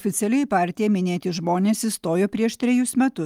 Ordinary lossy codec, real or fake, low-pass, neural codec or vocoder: Opus, 32 kbps; real; 19.8 kHz; none